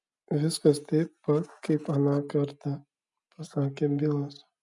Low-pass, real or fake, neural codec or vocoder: 10.8 kHz; real; none